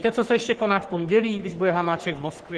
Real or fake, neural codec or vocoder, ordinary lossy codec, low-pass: fake; codec, 44.1 kHz, 3.4 kbps, Pupu-Codec; Opus, 16 kbps; 10.8 kHz